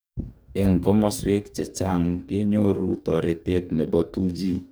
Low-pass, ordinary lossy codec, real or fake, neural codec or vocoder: none; none; fake; codec, 44.1 kHz, 2.6 kbps, DAC